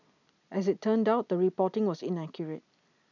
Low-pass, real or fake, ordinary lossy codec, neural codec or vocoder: 7.2 kHz; real; none; none